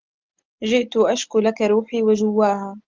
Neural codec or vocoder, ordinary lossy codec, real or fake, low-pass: none; Opus, 24 kbps; real; 7.2 kHz